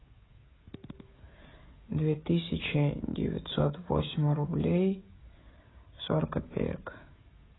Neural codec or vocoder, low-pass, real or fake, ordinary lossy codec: codec, 16 kHz, 16 kbps, FreqCodec, smaller model; 7.2 kHz; fake; AAC, 16 kbps